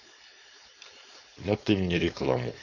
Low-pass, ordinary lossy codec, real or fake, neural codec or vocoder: 7.2 kHz; none; fake; codec, 16 kHz, 4.8 kbps, FACodec